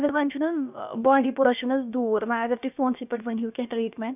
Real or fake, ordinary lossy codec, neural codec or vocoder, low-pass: fake; none; codec, 16 kHz, about 1 kbps, DyCAST, with the encoder's durations; 3.6 kHz